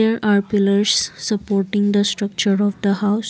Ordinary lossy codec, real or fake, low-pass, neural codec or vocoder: none; real; none; none